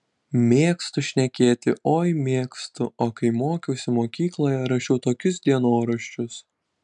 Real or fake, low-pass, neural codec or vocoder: real; 10.8 kHz; none